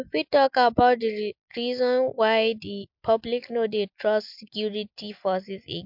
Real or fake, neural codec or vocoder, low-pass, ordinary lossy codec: real; none; 5.4 kHz; MP3, 48 kbps